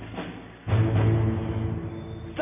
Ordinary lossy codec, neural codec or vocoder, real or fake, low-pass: none; none; real; 3.6 kHz